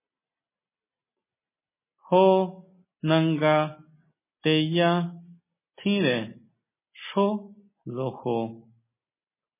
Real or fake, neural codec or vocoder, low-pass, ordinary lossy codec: real; none; 3.6 kHz; MP3, 16 kbps